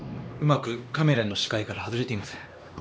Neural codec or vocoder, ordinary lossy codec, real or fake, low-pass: codec, 16 kHz, 2 kbps, X-Codec, HuBERT features, trained on LibriSpeech; none; fake; none